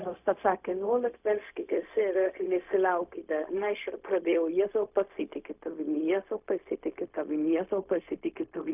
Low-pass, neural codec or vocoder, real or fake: 3.6 kHz; codec, 16 kHz, 0.4 kbps, LongCat-Audio-Codec; fake